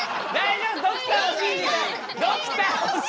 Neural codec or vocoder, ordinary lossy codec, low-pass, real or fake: none; none; none; real